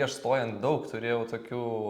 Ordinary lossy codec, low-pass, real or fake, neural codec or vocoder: Opus, 64 kbps; 19.8 kHz; real; none